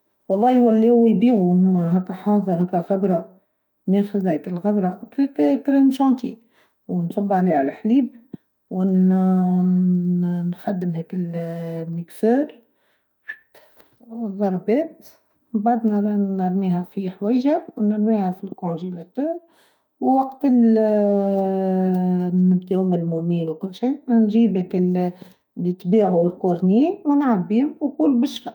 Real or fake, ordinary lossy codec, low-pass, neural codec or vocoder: fake; none; 19.8 kHz; autoencoder, 48 kHz, 32 numbers a frame, DAC-VAE, trained on Japanese speech